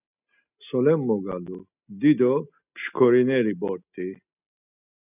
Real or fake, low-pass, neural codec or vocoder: real; 3.6 kHz; none